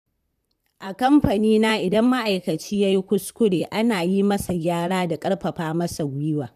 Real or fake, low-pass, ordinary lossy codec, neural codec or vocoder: fake; 14.4 kHz; none; vocoder, 44.1 kHz, 128 mel bands, Pupu-Vocoder